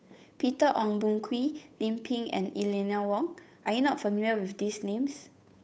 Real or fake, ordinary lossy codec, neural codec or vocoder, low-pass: fake; none; codec, 16 kHz, 8 kbps, FunCodec, trained on Chinese and English, 25 frames a second; none